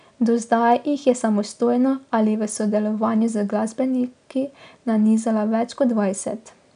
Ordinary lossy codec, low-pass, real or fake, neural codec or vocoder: none; 9.9 kHz; real; none